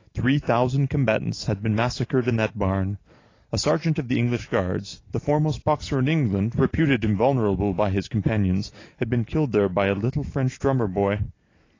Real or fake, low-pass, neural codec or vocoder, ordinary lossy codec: real; 7.2 kHz; none; AAC, 32 kbps